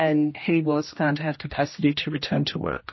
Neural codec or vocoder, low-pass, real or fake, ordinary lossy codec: codec, 16 kHz, 1 kbps, X-Codec, HuBERT features, trained on general audio; 7.2 kHz; fake; MP3, 24 kbps